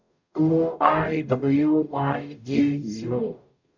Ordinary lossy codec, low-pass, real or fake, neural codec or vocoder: none; 7.2 kHz; fake; codec, 44.1 kHz, 0.9 kbps, DAC